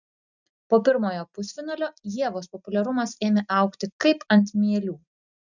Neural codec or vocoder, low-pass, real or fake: none; 7.2 kHz; real